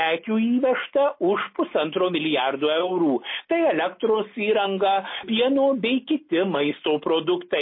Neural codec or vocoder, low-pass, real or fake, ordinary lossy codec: none; 5.4 kHz; real; MP3, 24 kbps